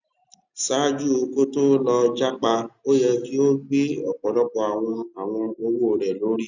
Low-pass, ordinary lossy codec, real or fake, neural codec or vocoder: 7.2 kHz; none; real; none